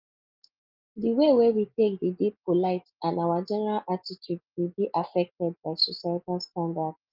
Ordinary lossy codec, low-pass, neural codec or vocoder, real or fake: Opus, 24 kbps; 5.4 kHz; none; real